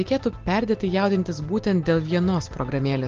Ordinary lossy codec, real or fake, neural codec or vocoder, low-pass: Opus, 16 kbps; real; none; 7.2 kHz